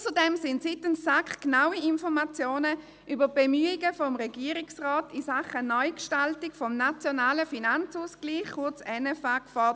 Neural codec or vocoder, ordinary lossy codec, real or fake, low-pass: none; none; real; none